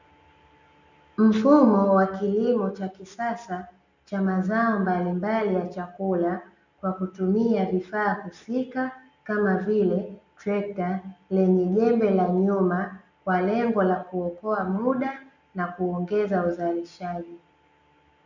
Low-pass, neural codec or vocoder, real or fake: 7.2 kHz; none; real